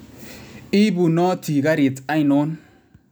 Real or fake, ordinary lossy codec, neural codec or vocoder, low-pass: real; none; none; none